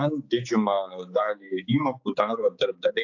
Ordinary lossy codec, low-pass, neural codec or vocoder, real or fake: AAC, 48 kbps; 7.2 kHz; codec, 16 kHz, 4 kbps, X-Codec, HuBERT features, trained on general audio; fake